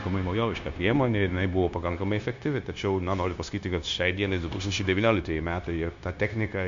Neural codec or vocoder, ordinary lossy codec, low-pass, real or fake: codec, 16 kHz, 0.9 kbps, LongCat-Audio-Codec; MP3, 64 kbps; 7.2 kHz; fake